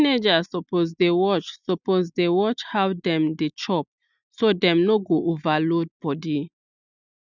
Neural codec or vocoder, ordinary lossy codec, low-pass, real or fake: none; none; 7.2 kHz; real